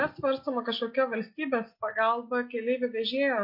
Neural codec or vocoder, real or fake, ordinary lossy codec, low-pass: none; real; MP3, 32 kbps; 5.4 kHz